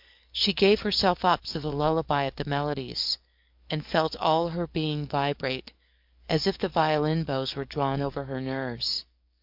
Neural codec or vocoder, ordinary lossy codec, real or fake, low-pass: codec, 44.1 kHz, 7.8 kbps, DAC; MP3, 48 kbps; fake; 5.4 kHz